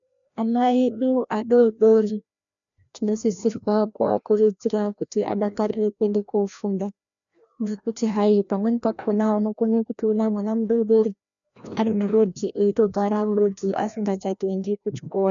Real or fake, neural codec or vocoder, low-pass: fake; codec, 16 kHz, 1 kbps, FreqCodec, larger model; 7.2 kHz